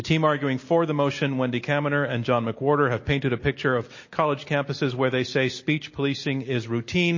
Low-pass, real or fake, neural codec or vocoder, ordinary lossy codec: 7.2 kHz; real; none; MP3, 32 kbps